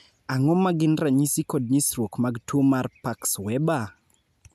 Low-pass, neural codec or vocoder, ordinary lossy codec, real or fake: 14.4 kHz; none; none; real